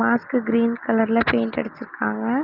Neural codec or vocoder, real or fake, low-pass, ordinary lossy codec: none; real; 5.4 kHz; Opus, 24 kbps